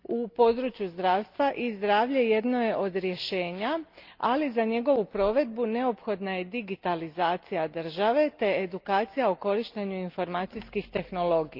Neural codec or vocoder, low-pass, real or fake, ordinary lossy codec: none; 5.4 kHz; real; Opus, 24 kbps